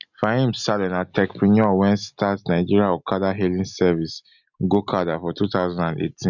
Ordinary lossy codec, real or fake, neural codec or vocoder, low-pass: none; real; none; 7.2 kHz